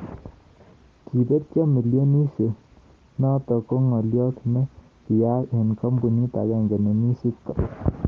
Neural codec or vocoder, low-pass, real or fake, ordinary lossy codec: none; 7.2 kHz; real; Opus, 16 kbps